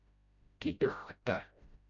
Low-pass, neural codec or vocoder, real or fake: 7.2 kHz; codec, 16 kHz, 0.5 kbps, FreqCodec, smaller model; fake